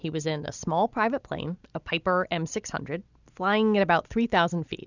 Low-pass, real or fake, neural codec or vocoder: 7.2 kHz; real; none